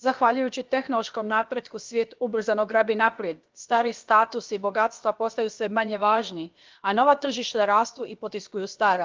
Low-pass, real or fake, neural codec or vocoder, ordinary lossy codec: 7.2 kHz; fake; codec, 16 kHz, about 1 kbps, DyCAST, with the encoder's durations; Opus, 32 kbps